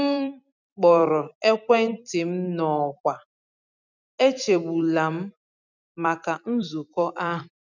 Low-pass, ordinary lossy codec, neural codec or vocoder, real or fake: 7.2 kHz; none; vocoder, 44.1 kHz, 128 mel bands every 256 samples, BigVGAN v2; fake